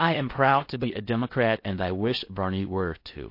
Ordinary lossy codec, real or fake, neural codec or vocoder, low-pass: MP3, 32 kbps; fake; codec, 16 kHz in and 24 kHz out, 0.8 kbps, FocalCodec, streaming, 65536 codes; 5.4 kHz